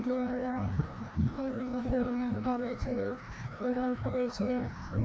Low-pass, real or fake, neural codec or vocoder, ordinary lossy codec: none; fake; codec, 16 kHz, 1 kbps, FreqCodec, larger model; none